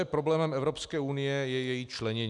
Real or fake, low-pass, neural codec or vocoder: real; 10.8 kHz; none